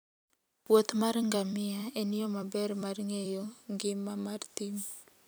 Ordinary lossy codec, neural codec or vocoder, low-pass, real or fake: none; vocoder, 44.1 kHz, 128 mel bands every 256 samples, BigVGAN v2; none; fake